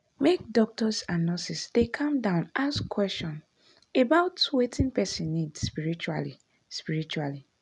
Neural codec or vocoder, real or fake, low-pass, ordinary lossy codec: none; real; 10.8 kHz; none